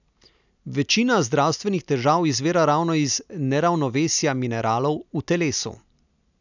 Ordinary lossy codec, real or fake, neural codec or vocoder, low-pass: none; real; none; 7.2 kHz